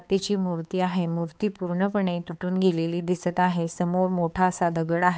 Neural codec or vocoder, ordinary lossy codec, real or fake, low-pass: codec, 16 kHz, 4 kbps, X-Codec, HuBERT features, trained on balanced general audio; none; fake; none